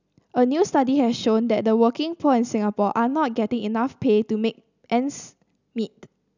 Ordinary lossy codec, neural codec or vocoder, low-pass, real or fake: none; none; 7.2 kHz; real